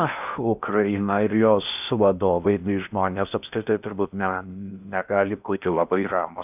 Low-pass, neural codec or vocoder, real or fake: 3.6 kHz; codec, 16 kHz in and 24 kHz out, 0.6 kbps, FocalCodec, streaming, 4096 codes; fake